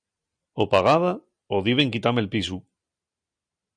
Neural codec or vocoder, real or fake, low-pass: none; real; 9.9 kHz